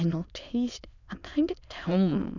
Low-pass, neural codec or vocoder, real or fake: 7.2 kHz; autoencoder, 22.05 kHz, a latent of 192 numbers a frame, VITS, trained on many speakers; fake